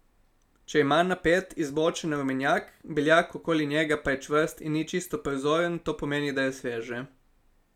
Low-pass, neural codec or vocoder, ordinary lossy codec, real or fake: 19.8 kHz; none; none; real